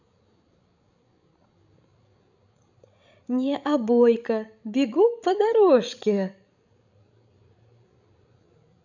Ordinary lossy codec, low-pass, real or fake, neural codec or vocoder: none; 7.2 kHz; fake; codec, 16 kHz, 16 kbps, FreqCodec, larger model